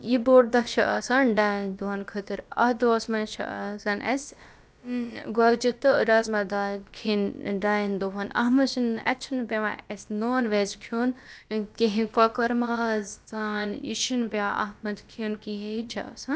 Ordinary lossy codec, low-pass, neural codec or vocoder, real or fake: none; none; codec, 16 kHz, about 1 kbps, DyCAST, with the encoder's durations; fake